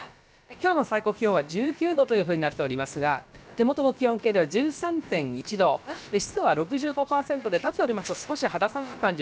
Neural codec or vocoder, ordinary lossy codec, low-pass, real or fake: codec, 16 kHz, about 1 kbps, DyCAST, with the encoder's durations; none; none; fake